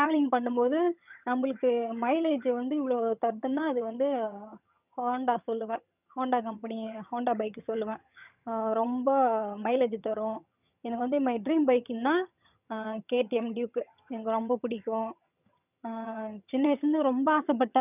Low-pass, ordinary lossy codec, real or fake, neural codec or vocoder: 3.6 kHz; none; fake; vocoder, 22.05 kHz, 80 mel bands, HiFi-GAN